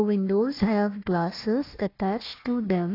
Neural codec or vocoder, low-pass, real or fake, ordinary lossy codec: codec, 16 kHz, 1 kbps, FunCodec, trained on Chinese and English, 50 frames a second; 5.4 kHz; fake; AAC, 24 kbps